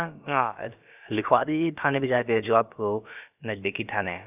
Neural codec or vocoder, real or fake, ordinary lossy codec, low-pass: codec, 16 kHz, about 1 kbps, DyCAST, with the encoder's durations; fake; none; 3.6 kHz